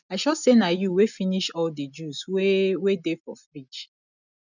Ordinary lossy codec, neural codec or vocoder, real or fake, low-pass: none; none; real; 7.2 kHz